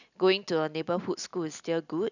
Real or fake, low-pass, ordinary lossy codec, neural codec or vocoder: real; 7.2 kHz; none; none